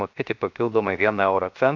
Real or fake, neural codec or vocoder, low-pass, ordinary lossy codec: fake; codec, 16 kHz, 0.7 kbps, FocalCodec; 7.2 kHz; MP3, 64 kbps